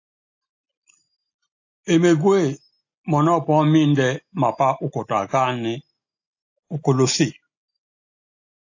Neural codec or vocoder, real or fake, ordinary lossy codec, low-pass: none; real; AAC, 48 kbps; 7.2 kHz